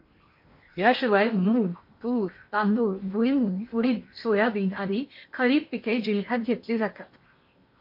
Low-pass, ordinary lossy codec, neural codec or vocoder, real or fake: 5.4 kHz; MP3, 32 kbps; codec, 16 kHz in and 24 kHz out, 0.8 kbps, FocalCodec, streaming, 65536 codes; fake